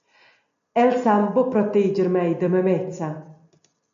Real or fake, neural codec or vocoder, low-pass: real; none; 7.2 kHz